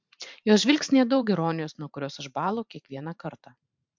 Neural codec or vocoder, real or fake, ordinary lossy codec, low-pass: vocoder, 44.1 kHz, 128 mel bands every 512 samples, BigVGAN v2; fake; MP3, 64 kbps; 7.2 kHz